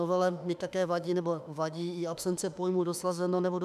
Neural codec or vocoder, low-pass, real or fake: autoencoder, 48 kHz, 32 numbers a frame, DAC-VAE, trained on Japanese speech; 14.4 kHz; fake